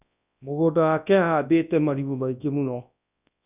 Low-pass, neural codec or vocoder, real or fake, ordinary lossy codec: 3.6 kHz; codec, 24 kHz, 0.9 kbps, WavTokenizer, large speech release; fake; none